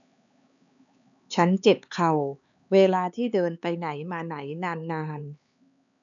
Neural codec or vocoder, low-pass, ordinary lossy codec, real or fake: codec, 16 kHz, 4 kbps, X-Codec, HuBERT features, trained on LibriSpeech; 7.2 kHz; none; fake